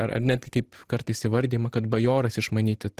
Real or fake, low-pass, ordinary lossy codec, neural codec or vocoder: fake; 14.4 kHz; Opus, 16 kbps; vocoder, 44.1 kHz, 128 mel bands every 512 samples, BigVGAN v2